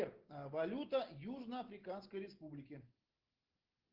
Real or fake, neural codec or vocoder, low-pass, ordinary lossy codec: real; none; 5.4 kHz; Opus, 16 kbps